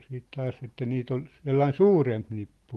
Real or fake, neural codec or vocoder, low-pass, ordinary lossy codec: real; none; 14.4 kHz; Opus, 24 kbps